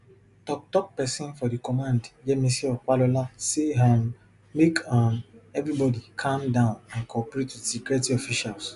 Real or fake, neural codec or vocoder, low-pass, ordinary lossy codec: real; none; 10.8 kHz; none